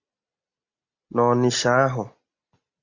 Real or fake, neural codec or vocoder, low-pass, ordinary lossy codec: real; none; 7.2 kHz; Opus, 64 kbps